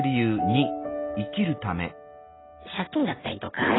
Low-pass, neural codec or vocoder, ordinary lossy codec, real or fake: 7.2 kHz; none; AAC, 16 kbps; real